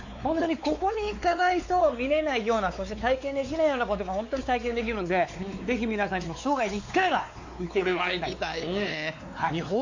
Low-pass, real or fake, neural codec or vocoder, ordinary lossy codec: 7.2 kHz; fake; codec, 16 kHz, 4 kbps, X-Codec, WavLM features, trained on Multilingual LibriSpeech; AAC, 48 kbps